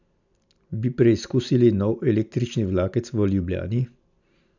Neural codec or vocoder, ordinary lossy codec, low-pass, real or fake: none; none; 7.2 kHz; real